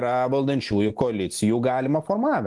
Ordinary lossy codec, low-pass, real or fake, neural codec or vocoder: Opus, 24 kbps; 10.8 kHz; real; none